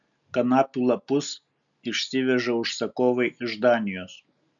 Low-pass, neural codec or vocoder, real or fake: 7.2 kHz; none; real